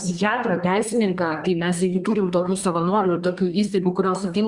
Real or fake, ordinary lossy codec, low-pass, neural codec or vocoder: fake; Opus, 64 kbps; 10.8 kHz; codec, 24 kHz, 1 kbps, SNAC